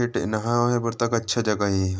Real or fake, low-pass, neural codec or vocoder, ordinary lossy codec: real; none; none; none